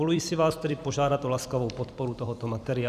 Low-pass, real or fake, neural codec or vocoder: 14.4 kHz; fake; vocoder, 44.1 kHz, 128 mel bands every 256 samples, BigVGAN v2